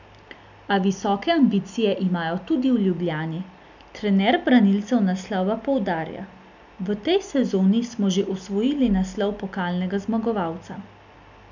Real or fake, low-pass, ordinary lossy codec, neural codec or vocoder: real; 7.2 kHz; none; none